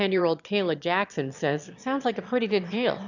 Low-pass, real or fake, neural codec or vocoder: 7.2 kHz; fake; autoencoder, 22.05 kHz, a latent of 192 numbers a frame, VITS, trained on one speaker